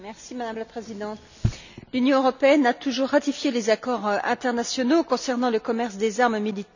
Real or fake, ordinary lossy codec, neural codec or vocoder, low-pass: real; none; none; 7.2 kHz